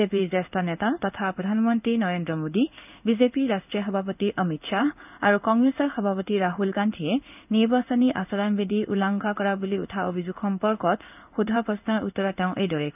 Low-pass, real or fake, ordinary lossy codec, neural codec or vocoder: 3.6 kHz; fake; none; codec, 16 kHz in and 24 kHz out, 1 kbps, XY-Tokenizer